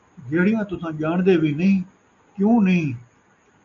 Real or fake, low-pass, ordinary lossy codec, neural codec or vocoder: real; 7.2 kHz; AAC, 64 kbps; none